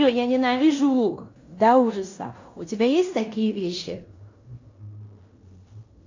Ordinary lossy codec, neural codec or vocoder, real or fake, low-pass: AAC, 48 kbps; codec, 16 kHz in and 24 kHz out, 0.9 kbps, LongCat-Audio-Codec, fine tuned four codebook decoder; fake; 7.2 kHz